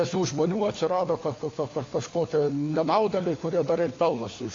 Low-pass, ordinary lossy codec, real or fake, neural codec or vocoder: 7.2 kHz; AAC, 32 kbps; fake; codec, 16 kHz, 4 kbps, FunCodec, trained on LibriTTS, 50 frames a second